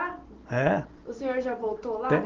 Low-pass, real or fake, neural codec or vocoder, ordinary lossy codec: 7.2 kHz; real; none; Opus, 16 kbps